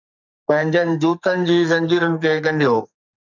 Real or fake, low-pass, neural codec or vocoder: fake; 7.2 kHz; codec, 44.1 kHz, 2.6 kbps, SNAC